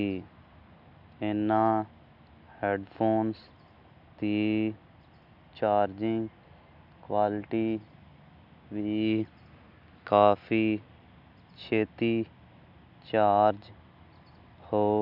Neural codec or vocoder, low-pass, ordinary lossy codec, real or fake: none; 5.4 kHz; none; real